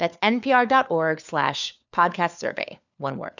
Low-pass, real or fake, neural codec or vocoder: 7.2 kHz; fake; codec, 16 kHz, 4 kbps, FunCodec, trained on LibriTTS, 50 frames a second